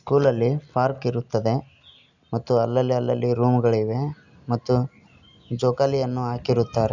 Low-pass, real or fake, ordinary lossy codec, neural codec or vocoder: 7.2 kHz; real; none; none